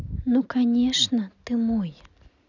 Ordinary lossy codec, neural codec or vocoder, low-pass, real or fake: none; none; 7.2 kHz; real